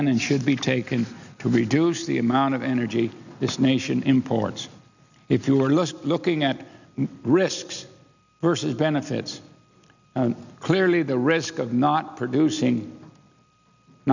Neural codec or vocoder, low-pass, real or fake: none; 7.2 kHz; real